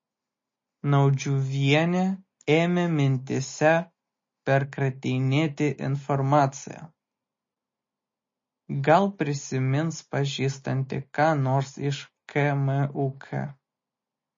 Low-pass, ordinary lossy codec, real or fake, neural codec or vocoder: 7.2 kHz; MP3, 32 kbps; real; none